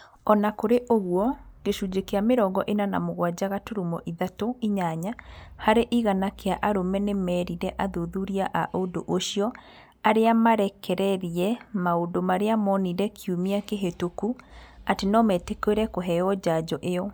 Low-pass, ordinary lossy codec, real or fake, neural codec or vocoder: none; none; real; none